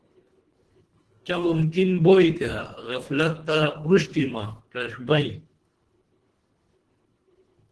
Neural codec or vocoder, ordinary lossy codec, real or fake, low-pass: codec, 24 kHz, 1.5 kbps, HILCodec; Opus, 16 kbps; fake; 10.8 kHz